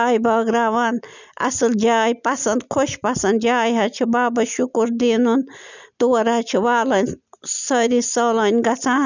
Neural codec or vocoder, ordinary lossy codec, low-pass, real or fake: none; none; 7.2 kHz; real